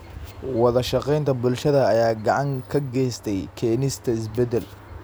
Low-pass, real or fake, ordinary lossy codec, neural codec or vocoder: none; real; none; none